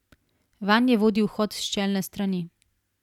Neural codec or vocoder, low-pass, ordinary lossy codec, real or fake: none; 19.8 kHz; none; real